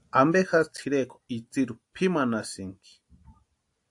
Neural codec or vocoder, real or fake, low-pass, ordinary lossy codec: none; real; 10.8 kHz; AAC, 64 kbps